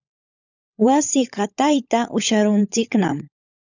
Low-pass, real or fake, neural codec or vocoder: 7.2 kHz; fake; codec, 16 kHz, 16 kbps, FunCodec, trained on LibriTTS, 50 frames a second